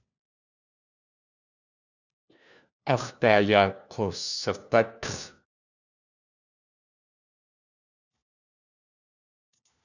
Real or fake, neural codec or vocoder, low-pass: fake; codec, 16 kHz, 1 kbps, FunCodec, trained on LibriTTS, 50 frames a second; 7.2 kHz